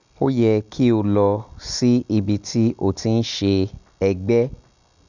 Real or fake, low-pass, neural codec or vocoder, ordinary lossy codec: real; 7.2 kHz; none; none